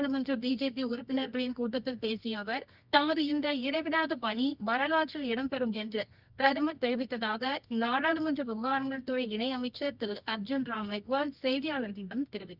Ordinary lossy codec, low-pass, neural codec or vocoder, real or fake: Opus, 64 kbps; 5.4 kHz; codec, 24 kHz, 0.9 kbps, WavTokenizer, medium music audio release; fake